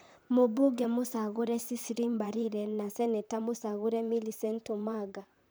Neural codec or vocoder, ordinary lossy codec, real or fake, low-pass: vocoder, 44.1 kHz, 128 mel bands, Pupu-Vocoder; none; fake; none